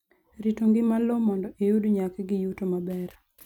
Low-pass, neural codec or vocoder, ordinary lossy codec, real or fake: 19.8 kHz; none; none; real